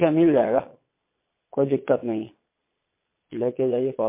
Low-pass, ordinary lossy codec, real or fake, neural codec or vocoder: 3.6 kHz; MP3, 24 kbps; fake; vocoder, 22.05 kHz, 80 mel bands, Vocos